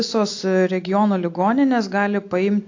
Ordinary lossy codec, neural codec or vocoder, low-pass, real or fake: AAC, 48 kbps; none; 7.2 kHz; real